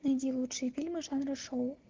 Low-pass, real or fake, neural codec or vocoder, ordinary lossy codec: 7.2 kHz; real; none; Opus, 16 kbps